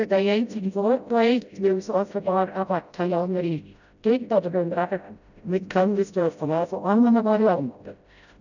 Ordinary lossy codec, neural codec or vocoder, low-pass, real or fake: none; codec, 16 kHz, 0.5 kbps, FreqCodec, smaller model; 7.2 kHz; fake